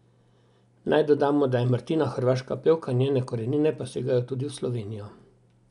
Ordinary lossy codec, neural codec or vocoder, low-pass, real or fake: none; none; 10.8 kHz; real